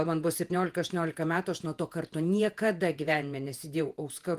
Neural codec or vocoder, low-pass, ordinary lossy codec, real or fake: vocoder, 48 kHz, 128 mel bands, Vocos; 14.4 kHz; Opus, 24 kbps; fake